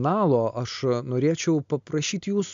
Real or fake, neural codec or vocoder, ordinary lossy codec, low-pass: real; none; MP3, 64 kbps; 7.2 kHz